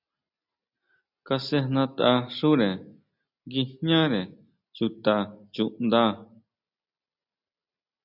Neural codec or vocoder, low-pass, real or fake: none; 5.4 kHz; real